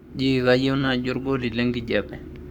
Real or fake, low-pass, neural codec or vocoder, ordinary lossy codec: fake; 19.8 kHz; codec, 44.1 kHz, 7.8 kbps, Pupu-Codec; none